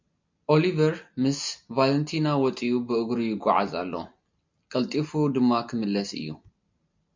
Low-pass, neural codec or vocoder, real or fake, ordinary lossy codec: 7.2 kHz; none; real; MP3, 48 kbps